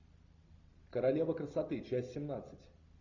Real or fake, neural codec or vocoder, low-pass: real; none; 7.2 kHz